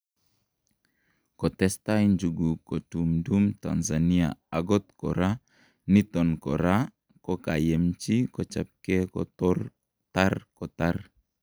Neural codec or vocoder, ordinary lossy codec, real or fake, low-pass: none; none; real; none